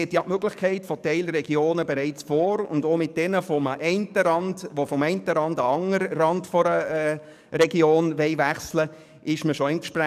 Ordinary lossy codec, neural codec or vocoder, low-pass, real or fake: none; codec, 44.1 kHz, 7.8 kbps, DAC; 14.4 kHz; fake